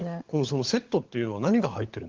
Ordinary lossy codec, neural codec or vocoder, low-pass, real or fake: Opus, 16 kbps; codec, 16 kHz, 16 kbps, FunCodec, trained on LibriTTS, 50 frames a second; 7.2 kHz; fake